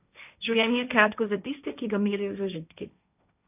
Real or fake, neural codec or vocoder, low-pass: fake; codec, 16 kHz, 1.1 kbps, Voila-Tokenizer; 3.6 kHz